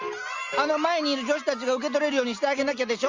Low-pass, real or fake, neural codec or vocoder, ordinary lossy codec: 7.2 kHz; real; none; Opus, 32 kbps